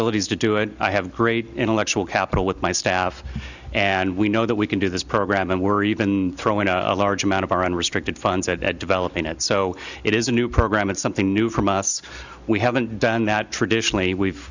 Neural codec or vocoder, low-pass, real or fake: none; 7.2 kHz; real